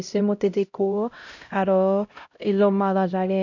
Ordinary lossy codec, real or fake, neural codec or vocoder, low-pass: none; fake; codec, 16 kHz, 0.5 kbps, X-Codec, HuBERT features, trained on LibriSpeech; 7.2 kHz